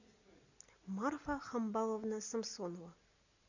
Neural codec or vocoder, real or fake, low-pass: none; real; 7.2 kHz